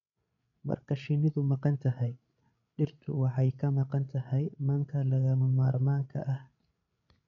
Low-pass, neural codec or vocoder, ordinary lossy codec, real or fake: 7.2 kHz; codec, 16 kHz, 16 kbps, FreqCodec, larger model; none; fake